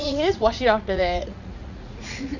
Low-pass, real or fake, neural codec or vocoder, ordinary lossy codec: 7.2 kHz; fake; vocoder, 44.1 kHz, 80 mel bands, Vocos; none